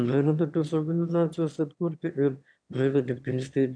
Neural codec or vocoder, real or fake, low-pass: autoencoder, 22.05 kHz, a latent of 192 numbers a frame, VITS, trained on one speaker; fake; 9.9 kHz